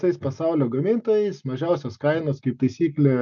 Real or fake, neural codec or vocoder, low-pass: real; none; 7.2 kHz